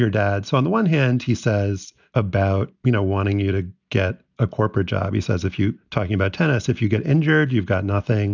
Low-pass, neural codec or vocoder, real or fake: 7.2 kHz; none; real